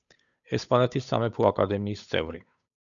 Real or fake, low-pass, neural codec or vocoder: fake; 7.2 kHz; codec, 16 kHz, 8 kbps, FunCodec, trained on Chinese and English, 25 frames a second